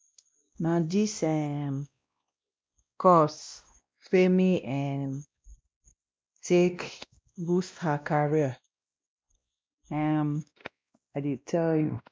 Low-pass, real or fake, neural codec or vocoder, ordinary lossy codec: none; fake; codec, 16 kHz, 1 kbps, X-Codec, WavLM features, trained on Multilingual LibriSpeech; none